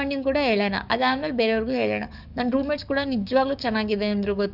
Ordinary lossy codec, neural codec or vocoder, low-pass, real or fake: none; codec, 44.1 kHz, 7.8 kbps, DAC; 5.4 kHz; fake